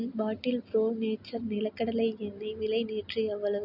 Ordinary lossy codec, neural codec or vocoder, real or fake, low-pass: none; none; real; 5.4 kHz